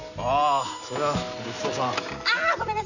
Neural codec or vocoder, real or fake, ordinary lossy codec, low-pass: none; real; none; 7.2 kHz